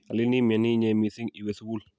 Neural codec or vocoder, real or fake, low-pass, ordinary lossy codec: none; real; none; none